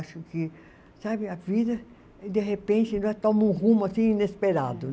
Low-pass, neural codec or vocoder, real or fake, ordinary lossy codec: none; none; real; none